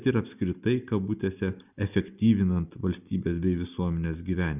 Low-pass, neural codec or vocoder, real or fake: 3.6 kHz; none; real